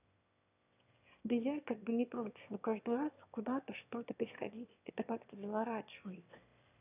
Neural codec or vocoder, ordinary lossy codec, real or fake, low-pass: autoencoder, 22.05 kHz, a latent of 192 numbers a frame, VITS, trained on one speaker; none; fake; 3.6 kHz